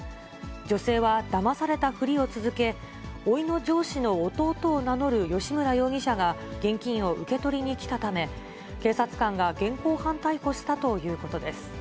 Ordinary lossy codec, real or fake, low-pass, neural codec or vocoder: none; real; none; none